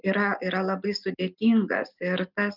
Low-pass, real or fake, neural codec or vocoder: 5.4 kHz; real; none